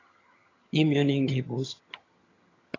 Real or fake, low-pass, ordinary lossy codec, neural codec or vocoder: fake; 7.2 kHz; AAC, 32 kbps; vocoder, 22.05 kHz, 80 mel bands, HiFi-GAN